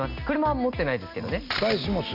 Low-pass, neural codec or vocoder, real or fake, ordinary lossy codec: 5.4 kHz; none; real; AAC, 48 kbps